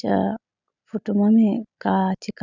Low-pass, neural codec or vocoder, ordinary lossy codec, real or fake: 7.2 kHz; none; none; real